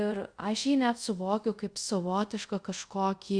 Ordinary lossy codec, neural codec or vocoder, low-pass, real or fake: Opus, 64 kbps; codec, 24 kHz, 0.5 kbps, DualCodec; 9.9 kHz; fake